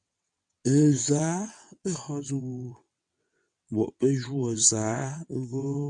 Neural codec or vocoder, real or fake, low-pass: vocoder, 22.05 kHz, 80 mel bands, WaveNeXt; fake; 9.9 kHz